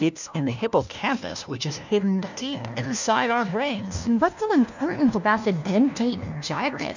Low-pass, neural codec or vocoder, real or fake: 7.2 kHz; codec, 16 kHz, 1 kbps, FunCodec, trained on LibriTTS, 50 frames a second; fake